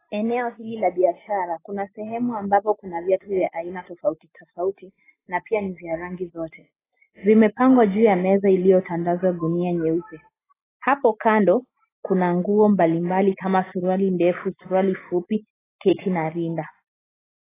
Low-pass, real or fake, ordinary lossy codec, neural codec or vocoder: 3.6 kHz; real; AAC, 16 kbps; none